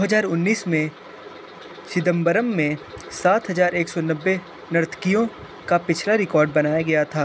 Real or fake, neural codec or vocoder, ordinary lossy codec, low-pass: real; none; none; none